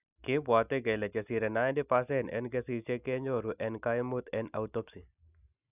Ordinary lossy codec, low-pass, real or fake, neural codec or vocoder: none; 3.6 kHz; real; none